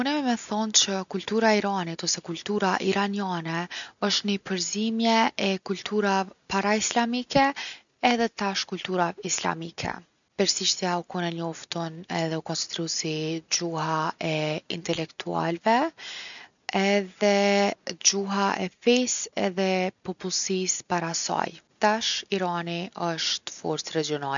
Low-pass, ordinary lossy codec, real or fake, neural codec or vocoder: 7.2 kHz; none; real; none